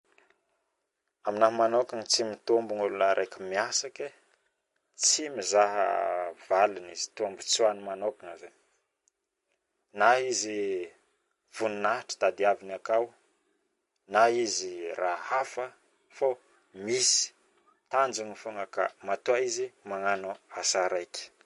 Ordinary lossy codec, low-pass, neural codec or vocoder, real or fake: MP3, 48 kbps; 14.4 kHz; none; real